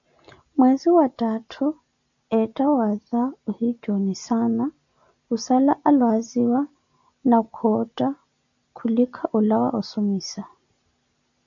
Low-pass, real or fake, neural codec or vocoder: 7.2 kHz; real; none